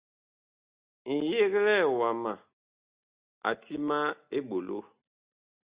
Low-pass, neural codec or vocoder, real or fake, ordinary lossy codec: 3.6 kHz; none; real; Opus, 64 kbps